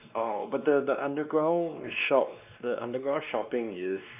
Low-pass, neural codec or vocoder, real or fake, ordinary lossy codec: 3.6 kHz; codec, 16 kHz, 2 kbps, X-Codec, WavLM features, trained on Multilingual LibriSpeech; fake; none